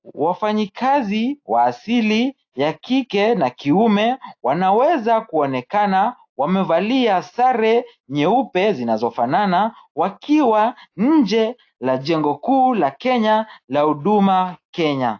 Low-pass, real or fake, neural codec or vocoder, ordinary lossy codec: 7.2 kHz; real; none; AAC, 48 kbps